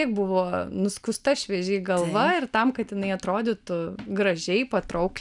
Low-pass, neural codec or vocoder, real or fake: 10.8 kHz; none; real